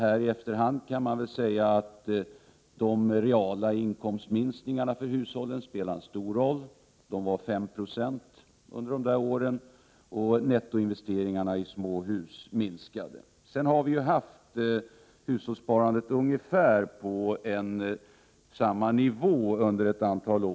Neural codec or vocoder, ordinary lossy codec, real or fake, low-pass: none; none; real; none